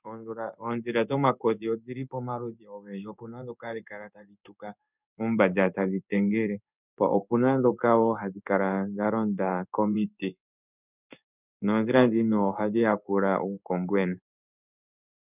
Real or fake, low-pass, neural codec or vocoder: fake; 3.6 kHz; codec, 16 kHz in and 24 kHz out, 1 kbps, XY-Tokenizer